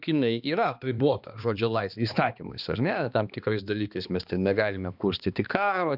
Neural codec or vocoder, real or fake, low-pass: codec, 16 kHz, 2 kbps, X-Codec, HuBERT features, trained on balanced general audio; fake; 5.4 kHz